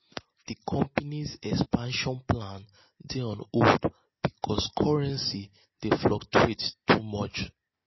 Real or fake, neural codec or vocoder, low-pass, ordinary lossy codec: real; none; 7.2 kHz; MP3, 24 kbps